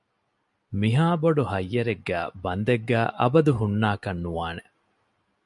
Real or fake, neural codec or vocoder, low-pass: real; none; 10.8 kHz